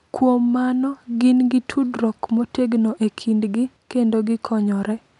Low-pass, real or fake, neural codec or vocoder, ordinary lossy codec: 10.8 kHz; real; none; none